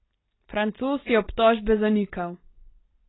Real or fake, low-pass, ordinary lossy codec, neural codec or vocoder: real; 7.2 kHz; AAC, 16 kbps; none